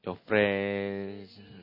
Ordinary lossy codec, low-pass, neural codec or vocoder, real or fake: MP3, 24 kbps; 5.4 kHz; none; real